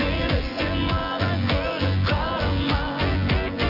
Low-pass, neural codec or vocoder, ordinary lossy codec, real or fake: 5.4 kHz; vocoder, 24 kHz, 100 mel bands, Vocos; Opus, 64 kbps; fake